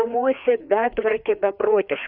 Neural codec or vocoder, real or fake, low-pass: codec, 16 kHz, 2 kbps, FreqCodec, larger model; fake; 5.4 kHz